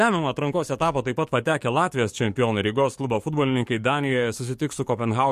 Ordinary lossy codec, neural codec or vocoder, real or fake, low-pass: MP3, 64 kbps; codec, 44.1 kHz, 7.8 kbps, DAC; fake; 14.4 kHz